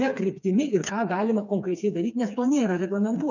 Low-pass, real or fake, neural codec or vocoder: 7.2 kHz; fake; codec, 16 kHz, 4 kbps, FreqCodec, smaller model